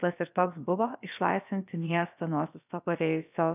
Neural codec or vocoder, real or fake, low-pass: codec, 16 kHz, about 1 kbps, DyCAST, with the encoder's durations; fake; 3.6 kHz